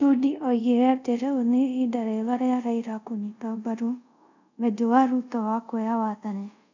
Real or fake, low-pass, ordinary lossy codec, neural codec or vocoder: fake; 7.2 kHz; none; codec, 24 kHz, 0.5 kbps, DualCodec